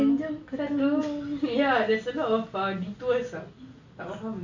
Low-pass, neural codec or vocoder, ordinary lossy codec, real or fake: 7.2 kHz; none; AAC, 48 kbps; real